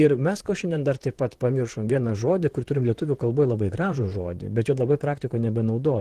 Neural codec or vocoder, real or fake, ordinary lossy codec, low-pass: vocoder, 44.1 kHz, 128 mel bands, Pupu-Vocoder; fake; Opus, 16 kbps; 14.4 kHz